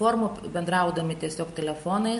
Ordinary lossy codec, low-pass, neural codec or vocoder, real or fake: MP3, 48 kbps; 14.4 kHz; none; real